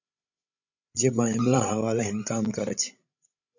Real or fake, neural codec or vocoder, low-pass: fake; codec, 16 kHz, 16 kbps, FreqCodec, larger model; 7.2 kHz